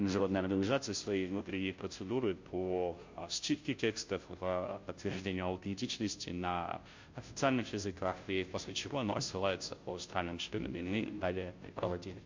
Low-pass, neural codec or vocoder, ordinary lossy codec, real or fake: 7.2 kHz; codec, 16 kHz, 0.5 kbps, FunCodec, trained on Chinese and English, 25 frames a second; MP3, 48 kbps; fake